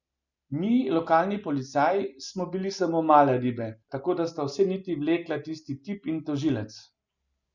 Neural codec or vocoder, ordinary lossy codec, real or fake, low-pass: none; none; real; 7.2 kHz